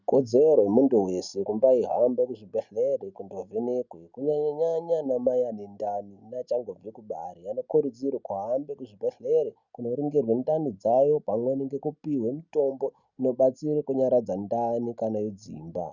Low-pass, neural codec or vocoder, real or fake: 7.2 kHz; none; real